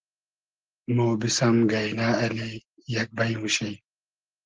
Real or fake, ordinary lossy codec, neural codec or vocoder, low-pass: real; Opus, 16 kbps; none; 7.2 kHz